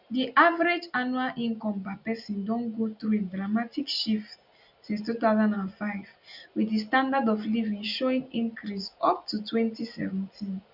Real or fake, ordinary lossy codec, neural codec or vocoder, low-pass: real; none; none; 5.4 kHz